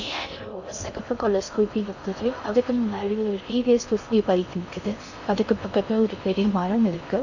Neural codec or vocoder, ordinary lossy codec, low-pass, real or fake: codec, 16 kHz in and 24 kHz out, 0.6 kbps, FocalCodec, streaming, 4096 codes; none; 7.2 kHz; fake